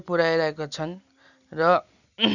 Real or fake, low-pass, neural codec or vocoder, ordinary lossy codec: real; 7.2 kHz; none; none